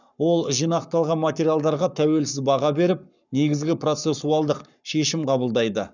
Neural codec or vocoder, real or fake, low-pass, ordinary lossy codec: codec, 44.1 kHz, 7.8 kbps, Pupu-Codec; fake; 7.2 kHz; none